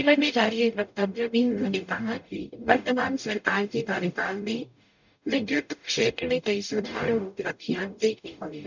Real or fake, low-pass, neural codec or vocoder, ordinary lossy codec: fake; 7.2 kHz; codec, 44.1 kHz, 0.9 kbps, DAC; none